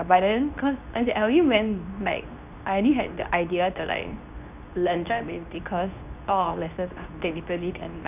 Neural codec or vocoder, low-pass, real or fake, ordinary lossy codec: codec, 24 kHz, 0.9 kbps, WavTokenizer, medium speech release version 2; 3.6 kHz; fake; none